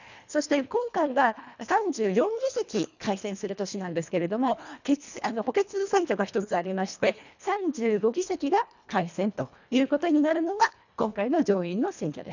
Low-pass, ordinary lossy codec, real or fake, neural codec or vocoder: 7.2 kHz; none; fake; codec, 24 kHz, 1.5 kbps, HILCodec